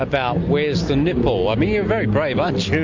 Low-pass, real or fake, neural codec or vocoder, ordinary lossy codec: 7.2 kHz; real; none; MP3, 48 kbps